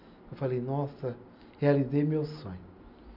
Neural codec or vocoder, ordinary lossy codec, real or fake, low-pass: none; none; real; 5.4 kHz